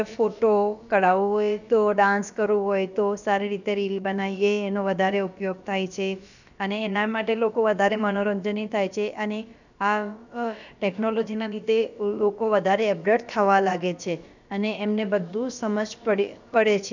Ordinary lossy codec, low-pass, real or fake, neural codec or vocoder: none; 7.2 kHz; fake; codec, 16 kHz, about 1 kbps, DyCAST, with the encoder's durations